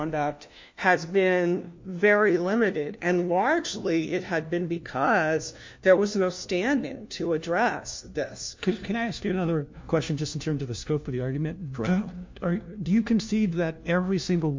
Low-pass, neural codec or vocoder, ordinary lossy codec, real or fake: 7.2 kHz; codec, 16 kHz, 1 kbps, FunCodec, trained on LibriTTS, 50 frames a second; MP3, 48 kbps; fake